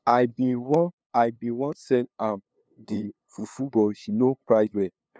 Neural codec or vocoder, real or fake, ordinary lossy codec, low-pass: codec, 16 kHz, 2 kbps, FunCodec, trained on LibriTTS, 25 frames a second; fake; none; none